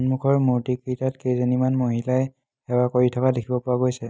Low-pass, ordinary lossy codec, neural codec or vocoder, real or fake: none; none; none; real